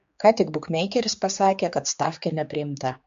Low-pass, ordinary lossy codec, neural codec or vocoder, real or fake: 7.2 kHz; MP3, 48 kbps; codec, 16 kHz, 4 kbps, X-Codec, HuBERT features, trained on general audio; fake